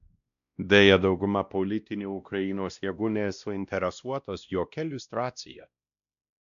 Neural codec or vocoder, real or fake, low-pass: codec, 16 kHz, 1 kbps, X-Codec, WavLM features, trained on Multilingual LibriSpeech; fake; 7.2 kHz